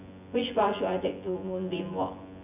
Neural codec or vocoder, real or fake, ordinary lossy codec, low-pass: vocoder, 24 kHz, 100 mel bands, Vocos; fake; none; 3.6 kHz